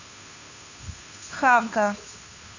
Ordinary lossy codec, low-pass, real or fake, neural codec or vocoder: none; 7.2 kHz; fake; codec, 16 kHz, 2 kbps, FunCodec, trained on Chinese and English, 25 frames a second